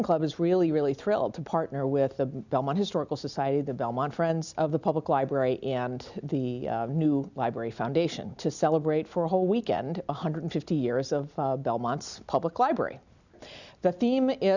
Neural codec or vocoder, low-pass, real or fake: none; 7.2 kHz; real